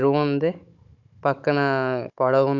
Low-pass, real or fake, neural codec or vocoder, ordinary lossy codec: 7.2 kHz; fake; codec, 16 kHz, 16 kbps, FunCodec, trained on Chinese and English, 50 frames a second; none